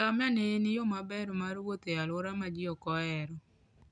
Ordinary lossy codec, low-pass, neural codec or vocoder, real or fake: none; 9.9 kHz; none; real